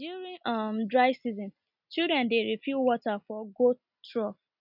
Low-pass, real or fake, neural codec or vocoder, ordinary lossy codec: 5.4 kHz; real; none; none